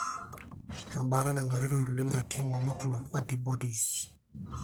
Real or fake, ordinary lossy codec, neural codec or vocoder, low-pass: fake; none; codec, 44.1 kHz, 1.7 kbps, Pupu-Codec; none